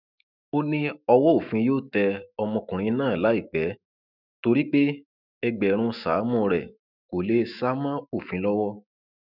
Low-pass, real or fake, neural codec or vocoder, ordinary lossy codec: 5.4 kHz; fake; autoencoder, 48 kHz, 128 numbers a frame, DAC-VAE, trained on Japanese speech; none